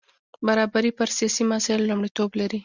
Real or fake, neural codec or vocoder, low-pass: real; none; 7.2 kHz